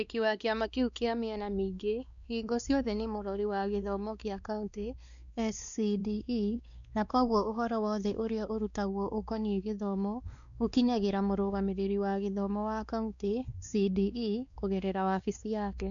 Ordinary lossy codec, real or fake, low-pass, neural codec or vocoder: none; fake; 7.2 kHz; codec, 16 kHz, 2 kbps, X-Codec, WavLM features, trained on Multilingual LibriSpeech